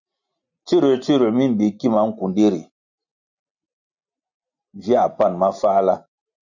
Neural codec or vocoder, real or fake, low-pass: none; real; 7.2 kHz